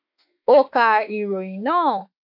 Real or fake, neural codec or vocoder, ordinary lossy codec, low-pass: fake; autoencoder, 48 kHz, 32 numbers a frame, DAC-VAE, trained on Japanese speech; none; 5.4 kHz